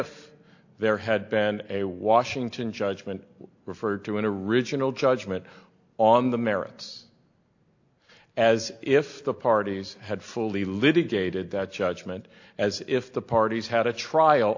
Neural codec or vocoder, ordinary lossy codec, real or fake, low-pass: none; AAC, 48 kbps; real; 7.2 kHz